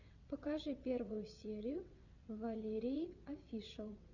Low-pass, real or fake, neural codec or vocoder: 7.2 kHz; fake; vocoder, 22.05 kHz, 80 mel bands, WaveNeXt